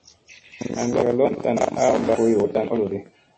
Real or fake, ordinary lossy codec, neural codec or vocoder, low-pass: real; MP3, 32 kbps; none; 10.8 kHz